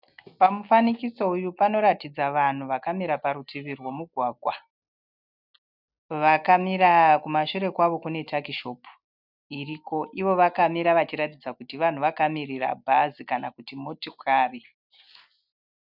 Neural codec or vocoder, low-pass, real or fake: none; 5.4 kHz; real